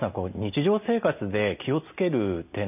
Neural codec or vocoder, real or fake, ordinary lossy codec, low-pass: none; real; none; 3.6 kHz